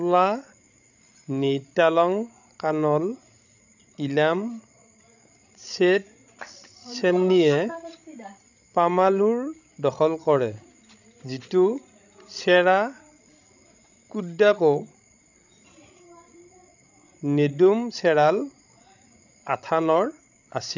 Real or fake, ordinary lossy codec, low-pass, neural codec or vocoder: fake; none; 7.2 kHz; codec, 16 kHz, 16 kbps, FreqCodec, larger model